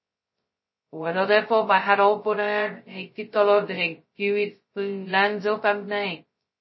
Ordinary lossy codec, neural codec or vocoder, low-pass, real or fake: MP3, 24 kbps; codec, 16 kHz, 0.2 kbps, FocalCodec; 7.2 kHz; fake